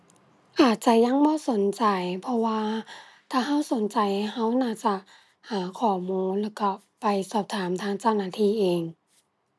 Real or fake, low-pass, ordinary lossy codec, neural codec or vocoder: real; none; none; none